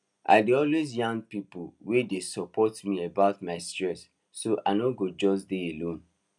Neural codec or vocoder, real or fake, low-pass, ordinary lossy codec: none; real; none; none